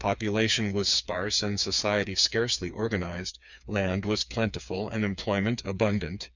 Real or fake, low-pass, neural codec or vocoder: fake; 7.2 kHz; codec, 16 kHz, 4 kbps, FreqCodec, smaller model